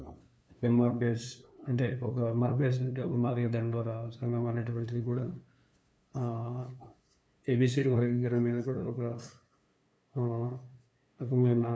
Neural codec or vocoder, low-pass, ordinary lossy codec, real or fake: codec, 16 kHz, 2 kbps, FunCodec, trained on LibriTTS, 25 frames a second; none; none; fake